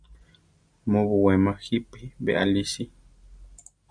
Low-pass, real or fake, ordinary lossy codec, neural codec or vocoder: 9.9 kHz; real; MP3, 96 kbps; none